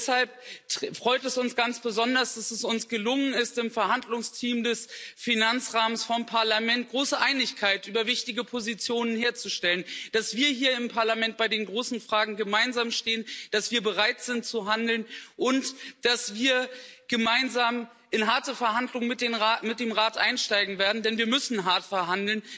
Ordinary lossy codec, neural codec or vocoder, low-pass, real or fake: none; none; none; real